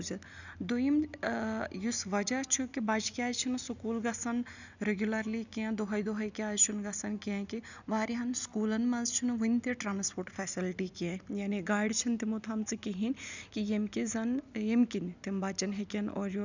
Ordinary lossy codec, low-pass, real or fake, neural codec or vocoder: none; 7.2 kHz; real; none